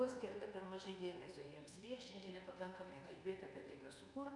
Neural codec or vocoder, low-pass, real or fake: codec, 24 kHz, 1.2 kbps, DualCodec; 10.8 kHz; fake